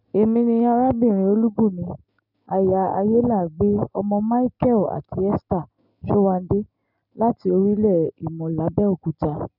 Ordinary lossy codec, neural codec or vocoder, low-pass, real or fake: none; none; 5.4 kHz; real